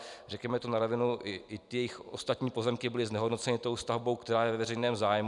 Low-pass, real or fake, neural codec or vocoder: 10.8 kHz; real; none